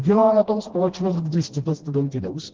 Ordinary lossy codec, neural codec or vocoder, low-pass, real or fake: Opus, 16 kbps; codec, 16 kHz, 1 kbps, FreqCodec, smaller model; 7.2 kHz; fake